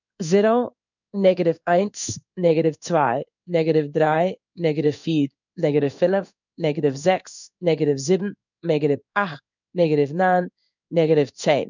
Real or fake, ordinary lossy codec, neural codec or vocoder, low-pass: fake; none; codec, 16 kHz in and 24 kHz out, 1 kbps, XY-Tokenizer; 7.2 kHz